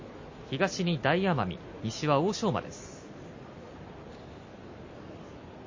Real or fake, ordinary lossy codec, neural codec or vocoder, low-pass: real; MP3, 32 kbps; none; 7.2 kHz